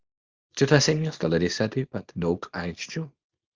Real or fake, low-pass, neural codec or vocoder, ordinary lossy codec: fake; 7.2 kHz; codec, 24 kHz, 0.9 kbps, WavTokenizer, small release; Opus, 32 kbps